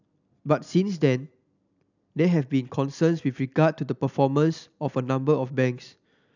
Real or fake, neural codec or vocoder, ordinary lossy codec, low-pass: real; none; none; 7.2 kHz